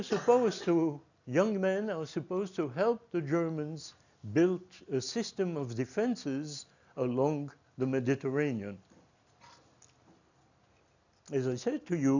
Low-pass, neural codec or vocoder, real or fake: 7.2 kHz; none; real